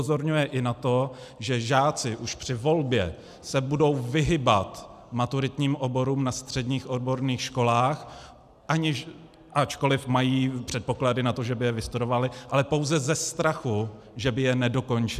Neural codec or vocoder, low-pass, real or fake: none; 14.4 kHz; real